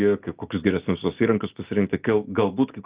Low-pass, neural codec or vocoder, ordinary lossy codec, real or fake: 3.6 kHz; none; Opus, 32 kbps; real